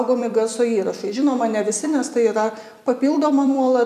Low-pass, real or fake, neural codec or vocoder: 14.4 kHz; fake; vocoder, 44.1 kHz, 128 mel bands every 256 samples, BigVGAN v2